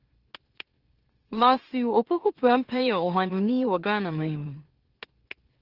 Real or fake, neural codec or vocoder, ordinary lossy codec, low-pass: fake; autoencoder, 44.1 kHz, a latent of 192 numbers a frame, MeloTTS; Opus, 16 kbps; 5.4 kHz